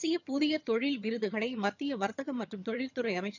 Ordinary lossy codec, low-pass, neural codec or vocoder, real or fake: none; 7.2 kHz; vocoder, 22.05 kHz, 80 mel bands, HiFi-GAN; fake